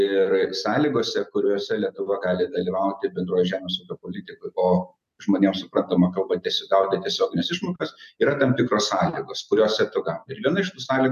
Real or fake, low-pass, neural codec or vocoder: real; 14.4 kHz; none